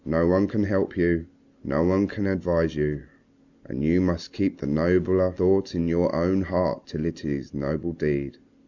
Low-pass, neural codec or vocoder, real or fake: 7.2 kHz; none; real